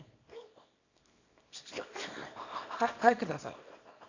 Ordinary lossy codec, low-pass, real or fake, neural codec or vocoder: AAC, 48 kbps; 7.2 kHz; fake; codec, 24 kHz, 0.9 kbps, WavTokenizer, small release